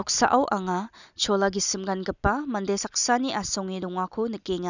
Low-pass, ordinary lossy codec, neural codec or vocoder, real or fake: 7.2 kHz; none; none; real